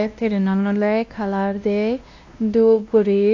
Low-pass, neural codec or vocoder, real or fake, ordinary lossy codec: 7.2 kHz; codec, 16 kHz, 1 kbps, X-Codec, WavLM features, trained on Multilingual LibriSpeech; fake; AAC, 48 kbps